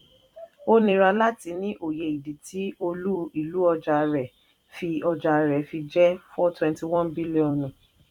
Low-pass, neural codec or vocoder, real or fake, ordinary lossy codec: 19.8 kHz; vocoder, 44.1 kHz, 128 mel bands every 256 samples, BigVGAN v2; fake; none